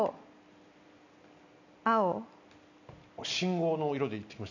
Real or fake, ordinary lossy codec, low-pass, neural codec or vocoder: real; none; 7.2 kHz; none